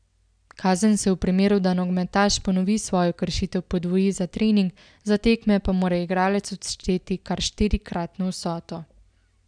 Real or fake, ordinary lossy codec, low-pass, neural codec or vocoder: real; none; 9.9 kHz; none